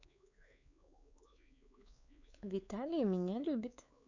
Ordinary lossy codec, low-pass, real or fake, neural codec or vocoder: none; 7.2 kHz; fake; codec, 16 kHz, 4 kbps, X-Codec, WavLM features, trained on Multilingual LibriSpeech